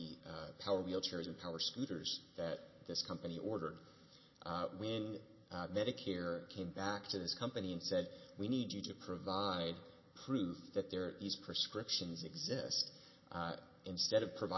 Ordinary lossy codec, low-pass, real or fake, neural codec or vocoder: MP3, 24 kbps; 7.2 kHz; real; none